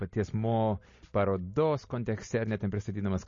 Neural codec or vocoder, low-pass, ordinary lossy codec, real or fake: none; 7.2 kHz; MP3, 32 kbps; real